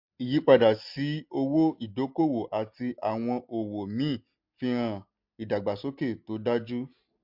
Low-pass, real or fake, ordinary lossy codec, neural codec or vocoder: 5.4 kHz; real; MP3, 48 kbps; none